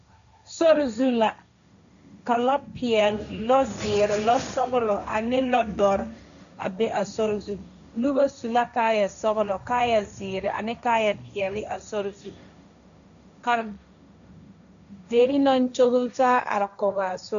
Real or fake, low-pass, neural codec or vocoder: fake; 7.2 kHz; codec, 16 kHz, 1.1 kbps, Voila-Tokenizer